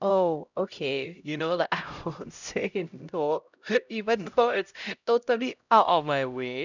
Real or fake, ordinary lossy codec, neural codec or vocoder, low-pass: fake; none; codec, 16 kHz, 0.5 kbps, X-Codec, HuBERT features, trained on LibriSpeech; 7.2 kHz